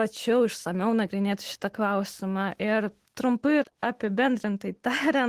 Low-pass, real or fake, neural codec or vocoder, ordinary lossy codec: 14.4 kHz; fake; autoencoder, 48 kHz, 128 numbers a frame, DAC-VAE, trained on Japanese speech; Opus, 16 kbps